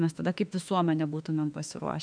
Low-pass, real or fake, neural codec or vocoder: 9.9 kHz; fake; autoencoder, 48 kHz, 32 numbers a frame, DAC-VAE, trained on Japanese speech